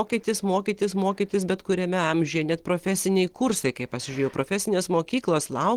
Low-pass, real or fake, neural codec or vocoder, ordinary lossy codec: 19.8 kHz; real; none; Opus, 16 kbps